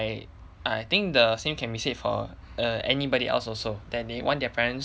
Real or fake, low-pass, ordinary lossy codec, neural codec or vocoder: real; none; none; none